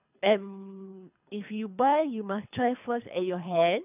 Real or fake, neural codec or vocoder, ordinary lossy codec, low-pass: fake; codec, 24 kHz, 3 kbps, HILCodec; none; 3.6 kHz